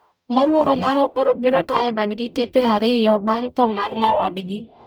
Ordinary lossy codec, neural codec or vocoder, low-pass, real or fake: none; codec, 44.1 kHz, 0.9 kbps, DAC; none; fake